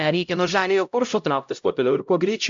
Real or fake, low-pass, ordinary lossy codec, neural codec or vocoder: fake; 7.2 kHz; MP3, 64 kbps; codec, 16 kHz, 0.5 kbps, X-Codec, HuBERT features, trained on LibriSpeech